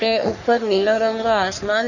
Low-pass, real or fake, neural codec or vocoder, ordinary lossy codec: 7.2 kHz; fake; codec, 44.1 kHz, 3.4 kbps, Pupu-Codec; none